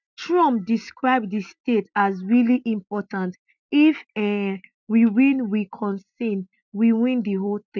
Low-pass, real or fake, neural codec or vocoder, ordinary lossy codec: 7.2 kHz; real; none; none